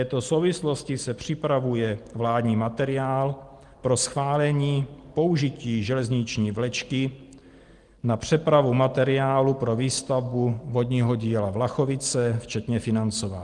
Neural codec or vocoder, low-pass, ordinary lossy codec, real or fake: none; 10.8 kHz; Opus, 24 kbps; real